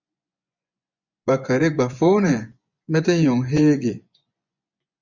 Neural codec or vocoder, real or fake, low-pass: vocoder, 24 kHz, 100 mel bands, Vocos; fake; 7.2 kHz